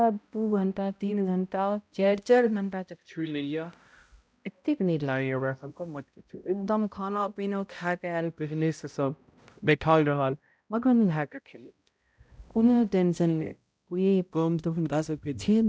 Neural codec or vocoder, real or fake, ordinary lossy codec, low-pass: codec, 16 kHz, 0.5 kbps, X-Codec, HuBERT features, trained on balanced general audio; fake; none; none